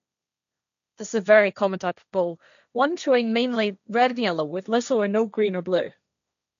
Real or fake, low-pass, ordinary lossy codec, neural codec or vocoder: fake; 7.2 kHz; none; codec, 16 kHz, 1.1 kbps, Voila-Tokenizer